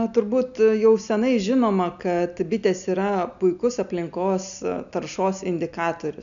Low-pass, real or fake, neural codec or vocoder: 7.2 kHz; real; none